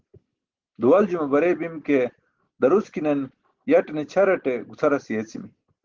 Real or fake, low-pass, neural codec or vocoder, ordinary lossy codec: real; 7.2 kHz; none; Opus, 16 kbps